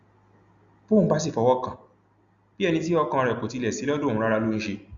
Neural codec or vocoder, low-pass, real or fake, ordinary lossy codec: none; 7.2 kHz; real; Opus, 64 kbps